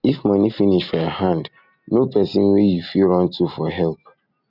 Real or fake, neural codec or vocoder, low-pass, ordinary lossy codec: real; none; 5.4 kHz; none